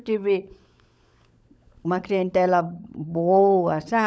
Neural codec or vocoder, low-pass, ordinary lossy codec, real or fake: codec, 16 kHz, 16 kbps, FunCodec, trained on LibriTTS, 50 frames a second; none; none; fake